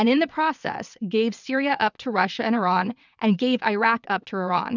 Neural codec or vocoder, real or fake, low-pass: vocoder, 22.05 kHz, 80 mel bands, WaveNeXt; fake; 7.2 kHz